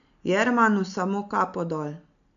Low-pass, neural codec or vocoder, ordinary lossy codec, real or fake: 7.2 kHz; none; none; real